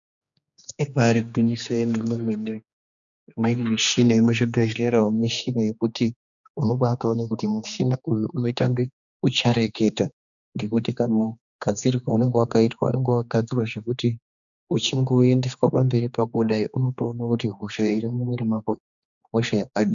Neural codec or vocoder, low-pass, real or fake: codec, 16 kHz, 2 kbps, X-Codec, HuBERT features, trained on general audio; 7.2 kHz; fake